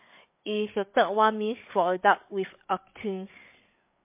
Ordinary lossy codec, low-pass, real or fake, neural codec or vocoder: MP3, 24 kbps; 3.6 kHz; fake; codec, 16 kHz, 8 kbps, FreqCodec, larger model